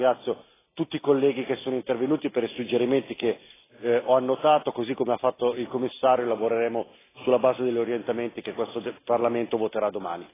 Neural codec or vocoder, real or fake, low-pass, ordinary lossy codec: none; real; 3.6 kHz; AAC, 16 kbps